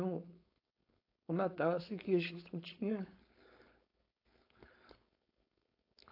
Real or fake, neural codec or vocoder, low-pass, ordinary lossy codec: fake; codec, 16 kHz, 4.8 kbps, FACodec; 5.4 kHz; AAC, 32 kbps